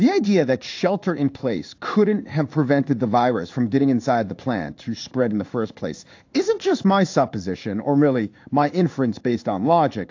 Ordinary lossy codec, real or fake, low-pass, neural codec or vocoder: AAC, 48 kbps; fake; 7.2 kHz; codec, 16 kHz in and 24 kHz out, 1 kbps, XY-Tokenizer